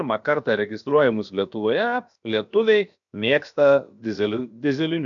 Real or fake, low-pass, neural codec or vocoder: fake; 7.2 kHz; codec, 16 kHz, 0.7 kbps, FocalCodec